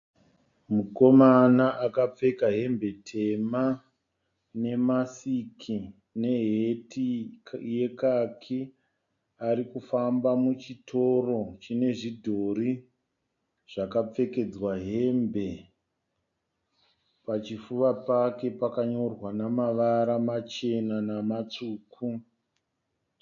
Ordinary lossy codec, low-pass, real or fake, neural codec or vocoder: AAC, 64 kbps; 7.2 kHz; real; none